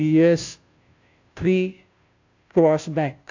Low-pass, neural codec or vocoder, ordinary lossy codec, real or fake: 7.2 kHz; codec, 16 kHz, 0.5 kbps, FunCodec, trained on Chinese and English, 25 frames a second; AAC, 48 kbps; fake